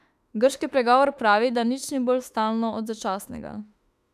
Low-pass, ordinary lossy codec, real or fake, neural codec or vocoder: 14.4 kHz; none; fake; autoencoder, 48 kHz, 32 numbers a frame, DAC-VAE, trained on Japanese speech